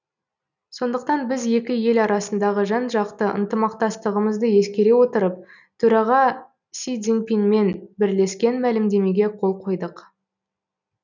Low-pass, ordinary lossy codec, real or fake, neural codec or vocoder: 7.2 kHz; none; real; none